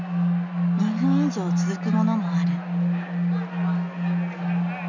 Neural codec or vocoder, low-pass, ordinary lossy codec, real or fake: none; 7.2 kHz; none; real